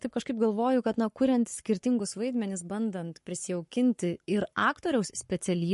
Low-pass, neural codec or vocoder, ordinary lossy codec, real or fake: 14.4 kHz; codec, 44.1 kHz, 7.8 kbps, Pupu-Codec; MP3, 48 kbps; fake